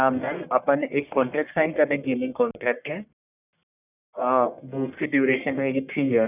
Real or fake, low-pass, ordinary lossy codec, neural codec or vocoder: fake; 3.6 kHz; none; codec, 44.1 kHz, 1.7 kbps, Pupu-Codec